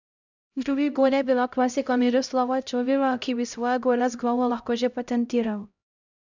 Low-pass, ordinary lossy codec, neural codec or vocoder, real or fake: 7.2 kHz; none; codec, 16 kHz, 0.5 kbps, X-Codec, HuBERT features, trained on LibriSpeech; fake